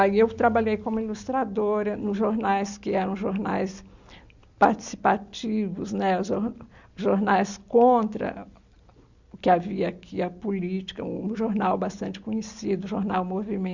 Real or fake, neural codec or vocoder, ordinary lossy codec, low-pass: real; none; Opus, 64 kbps; 7.2 kHz